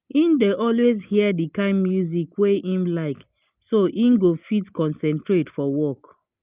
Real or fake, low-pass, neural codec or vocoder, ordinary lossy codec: real; 3.6 kHz; none; Opus, 24 kbps